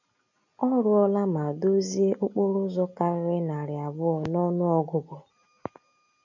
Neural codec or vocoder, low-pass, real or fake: none; 7.2 kHz; real